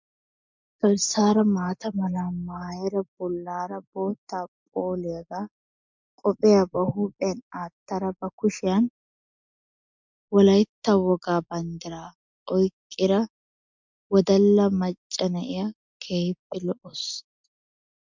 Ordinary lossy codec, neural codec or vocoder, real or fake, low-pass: MP3, 64 kbps; none; real; 7.2 kHz